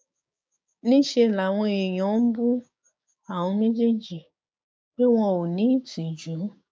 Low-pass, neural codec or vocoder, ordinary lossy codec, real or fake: none; codec, 16 kHz, 6 kbps, DAC; none; fake